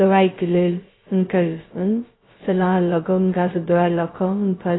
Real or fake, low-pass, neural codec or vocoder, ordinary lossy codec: fake; 7.2 kHz; codec, 16 kHz, 0.2 kbps, FocalCodec; AAC, 16 kbps